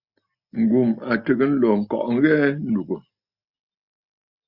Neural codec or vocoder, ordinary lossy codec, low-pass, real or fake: none; Opus, 64 kbps; 5.4 kHz; real